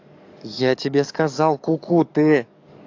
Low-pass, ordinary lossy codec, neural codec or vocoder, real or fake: 7.2 kHz; AAC, 48 kbps; codec, 44.1 kHz, 7.8 kbps, DAC; fake